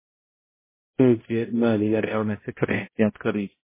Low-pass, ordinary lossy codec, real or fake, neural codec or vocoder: 3.6 kHz; MP3, 16 kbps; fake; codec, 16 kHz, 0.5 kbps, X-Codec, HuBERT features, trained on balanced general audio